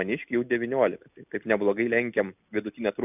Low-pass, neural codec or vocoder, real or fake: 3.6 kHz; none; real